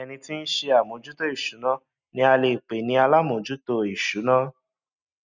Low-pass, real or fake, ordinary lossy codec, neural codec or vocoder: 7.2 kHz; real; none; none